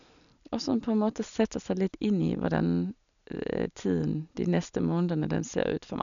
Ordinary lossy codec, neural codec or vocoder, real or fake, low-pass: none; none; real; 7.2 kHz